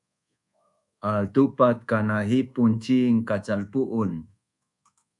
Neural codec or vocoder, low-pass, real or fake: codec, 24 kHz, 1.2 kbps, DualCodec; 10.8 kHz; fake